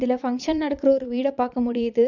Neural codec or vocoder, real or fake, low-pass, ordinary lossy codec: vocoder, 22.05 kHz, 80 mel bands, Vocos; fake; 7.2 kHz; none